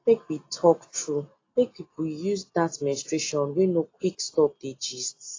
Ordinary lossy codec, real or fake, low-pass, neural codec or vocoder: AAC, 32 kbps; real; 7.2 kHz; none